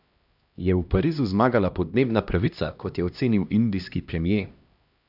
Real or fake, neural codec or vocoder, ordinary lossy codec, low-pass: fake; codec, 16 kHz, 1 kbps, X-Codec, HuBERT features, trained on LibriSpeech; none; 5.4 kHz